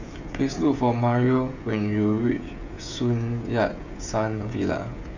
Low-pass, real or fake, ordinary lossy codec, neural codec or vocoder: 7.2 kHz; fake; none; codec, 16 kHz, 16 kbps, FreqCodec, smaller model